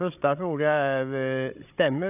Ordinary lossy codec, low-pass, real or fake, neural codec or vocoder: none; 3.6 kHz; fake; codec, 16 kHz, 16 kbps, FreqCodec, larger model